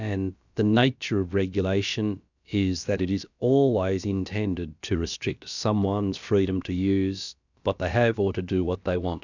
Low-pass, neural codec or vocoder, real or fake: 7.2 kHz; codec, 16 kHz, about 1 kbps, DyCAST, with the encoder's durations; fake